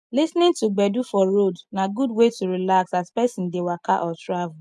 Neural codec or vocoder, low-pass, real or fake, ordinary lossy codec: none; none; real; none